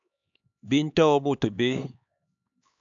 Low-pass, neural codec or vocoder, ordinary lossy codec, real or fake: 7.2 kHz; codec, 16 kHz, 4 kbps, X-Codec, HuBERT features, trained on LibriSpeech; AAC, 64 kbps; fake